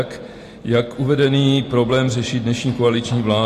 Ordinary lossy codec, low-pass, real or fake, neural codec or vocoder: AAC, 48 kbps; 14.4 kHz; real; none